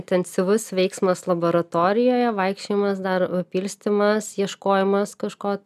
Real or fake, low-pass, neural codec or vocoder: real; 14.4 kHz; none